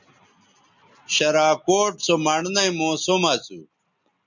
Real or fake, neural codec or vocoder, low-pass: real; none; 7.2 kHz